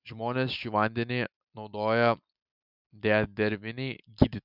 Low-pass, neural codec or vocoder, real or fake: 5.4 kHz; none; real